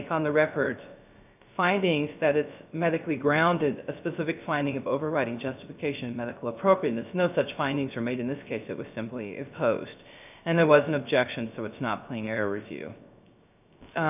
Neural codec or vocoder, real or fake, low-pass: codec, 16 kHz, 0.3 kbps, FocalCodec; fake; 3.6 kHz